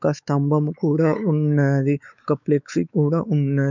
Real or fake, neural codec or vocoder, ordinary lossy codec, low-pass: fake; codec, 16 kHz, 4 kbps, X-Codec, WavLM features, trained on Multilingual LibriSpeech; none; 7.2 kHz